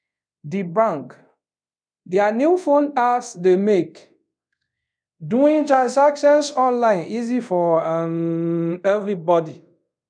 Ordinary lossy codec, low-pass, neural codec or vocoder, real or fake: none; 9.9 kHz; codec, 24 kHz, 0.5 kbps, DualCodec; fake